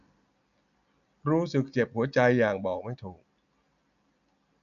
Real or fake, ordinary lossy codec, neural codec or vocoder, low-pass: real; none; none; 7.2 kHz